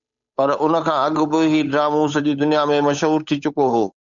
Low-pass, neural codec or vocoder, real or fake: 7.2 kHz; codec, 16 kHz, 8 kbps, FunCodec, trained on Chinese and English, 25 frames a second; fake